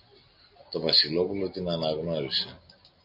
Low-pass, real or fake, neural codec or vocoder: 5.4 kHz; real; none